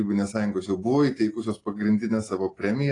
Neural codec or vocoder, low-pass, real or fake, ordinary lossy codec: none; 10.8 kHz; real; AAC, 32 kbps